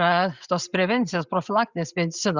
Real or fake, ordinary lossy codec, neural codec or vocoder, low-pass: real; Opus, 64 kbps; none; 7.2 kHz